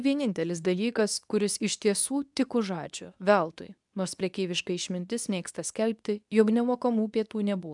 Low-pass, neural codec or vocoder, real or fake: 10.8 kHz; codec, 24 kHz, 0.9 kbps, WavTokenizer, medium speech release version 2; fake